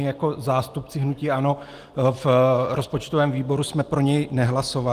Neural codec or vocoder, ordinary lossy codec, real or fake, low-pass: none; Opus, 24 kbps; real; 14.4 kHz